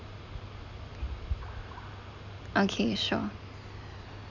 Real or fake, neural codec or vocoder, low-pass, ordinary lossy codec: real; none; 7.2 kHz; none